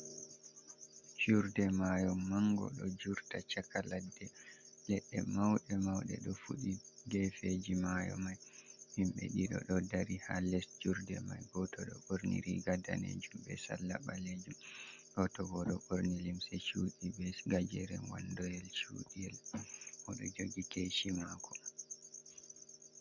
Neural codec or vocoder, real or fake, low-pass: none; real; 7.2 kHz